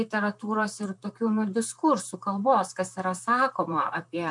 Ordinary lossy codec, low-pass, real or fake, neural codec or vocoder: MP3, 96 kbps; 10.8 kHz; fake; vocoder, 44.1 kHz, 128 mel bands, Pupu-Vocoder